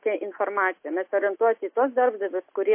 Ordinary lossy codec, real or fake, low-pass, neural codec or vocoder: MP3, 24 kbps; real; 3.6 kHz; none